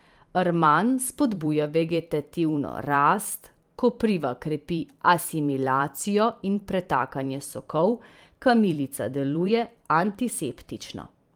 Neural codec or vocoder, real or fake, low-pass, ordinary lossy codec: vocoder, 44.1 kHz, 128 mel bands every 512 samples, BigVGAN v2; fake; 19.8 kHz; Opus, 32 kbps